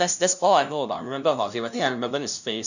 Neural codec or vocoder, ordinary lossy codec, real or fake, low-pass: codec, 16 kHz, 0.5 kbps, FunCodec, trained on LibriTTS, 25 frames a second; none; fake; 7.2 kHz